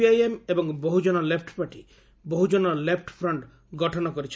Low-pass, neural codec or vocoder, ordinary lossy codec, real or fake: none; none; none; real